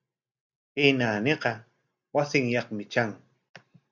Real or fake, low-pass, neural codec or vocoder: real; 7.2 kHz; none